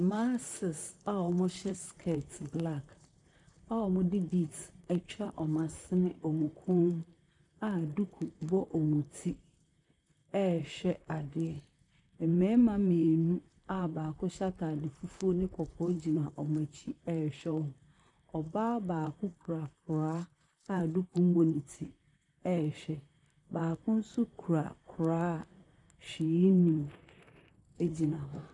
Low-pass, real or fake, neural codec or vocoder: 10.8 kHz; fake; vocoder, 44.1 kHz, 128 mel bands, Pupu-Vocoder